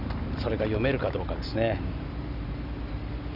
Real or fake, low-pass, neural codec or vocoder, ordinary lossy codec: real; 5.4 kHz; none; none